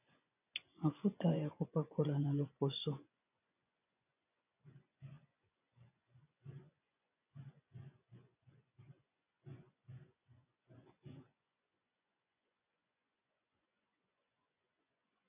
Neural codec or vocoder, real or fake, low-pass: vocoder, 24 kHz, 100 mel bands, Vocos; fake; 3.6 kHz